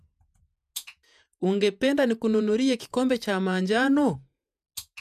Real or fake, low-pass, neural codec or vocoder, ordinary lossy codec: fake; 14.4 kHz; vocoder, 48 kHz, 128 mel bands, Vocos; none